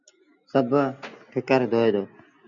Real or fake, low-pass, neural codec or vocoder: real; 7.2 kHz; none